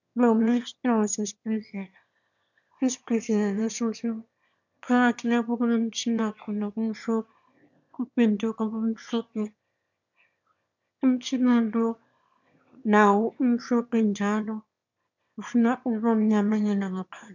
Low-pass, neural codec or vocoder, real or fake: 7.2 kHz; autoencoder, 22.05 kHz, a latent of 192 numbers a frame, VITS, trained on one speaker; fake